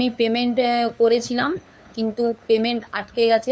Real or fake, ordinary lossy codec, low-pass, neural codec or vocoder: fake; none; none; codec, 16 kHz, 2 kbps, FunCodec, trained on LibriTTS, 25 frames a second